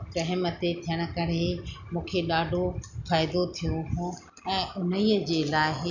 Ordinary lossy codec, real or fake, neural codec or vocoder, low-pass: none; real; none; 7.2 kHz